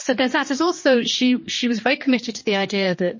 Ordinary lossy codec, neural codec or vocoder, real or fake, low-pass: MP3, 32 kbps; codec, 16 kHz, 2 kbps, X-Codec, HuBERT features, trained on general audio; fake; 7.2 kHz